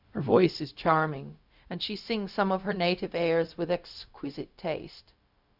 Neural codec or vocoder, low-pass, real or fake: codec, 16 kHz, 0.4 kbps, LongCat-Audio-Codec; 5.4 kHz; fake